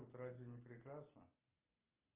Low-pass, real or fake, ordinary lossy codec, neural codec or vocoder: 3.6 kHz; real; Opus, 24 kbps; none